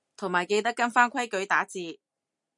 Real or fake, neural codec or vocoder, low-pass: real; none; 10.8 kHz